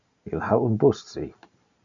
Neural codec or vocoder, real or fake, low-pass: none; real; 7.2 kHz